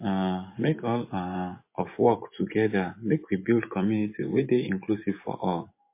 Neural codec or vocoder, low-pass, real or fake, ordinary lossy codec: vocoder, 24 kHz, 100 mel bands, Vocos; 3.6 kHz; fake; MP3, 24 kbps